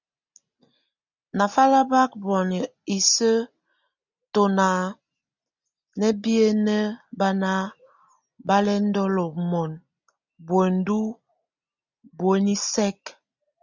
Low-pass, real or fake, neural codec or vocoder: 7.2 kHz; real; none